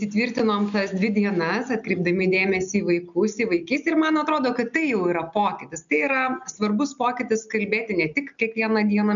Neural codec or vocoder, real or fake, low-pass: none; real; 7.2 kHz